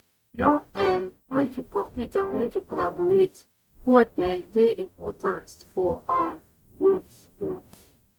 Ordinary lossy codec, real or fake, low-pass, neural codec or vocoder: MP3, 96 kbps; fake; 19.8 kHz; codec, 44.1 kHz, 0.9 kbps, DAC